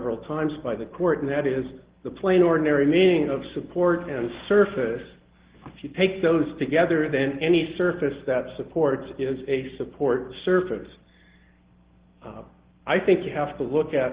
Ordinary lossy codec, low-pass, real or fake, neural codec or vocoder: Opus, 16 kbps; 3.6 kHz; real; none